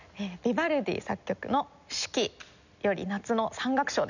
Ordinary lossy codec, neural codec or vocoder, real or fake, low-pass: none; none; real; 7.2 kHz